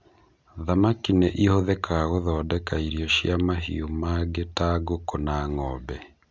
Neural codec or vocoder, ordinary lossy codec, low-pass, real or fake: none; none; none; real